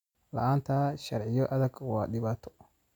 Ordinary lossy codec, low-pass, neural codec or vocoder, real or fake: none; 19.8 kHz; none; real